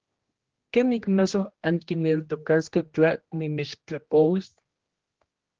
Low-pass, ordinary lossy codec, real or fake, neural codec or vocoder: 7.2 kHz; Opus, 16 kbps; fake; codec, 16 kHz, 1 kbps, X-Codec, HuBERT features, trained on general audio